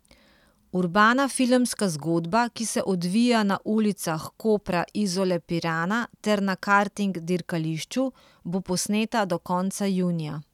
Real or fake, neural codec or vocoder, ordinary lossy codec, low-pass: real; none; none; 19.8 kHz